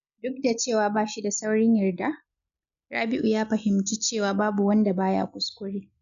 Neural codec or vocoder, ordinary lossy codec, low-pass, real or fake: none; none; 7.2 kHz; real